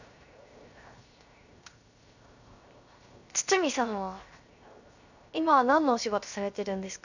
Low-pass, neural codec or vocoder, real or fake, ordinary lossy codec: 7.2 kHz; codec, 16 kHz, 0.7 kbps, FocalCodec; fake; none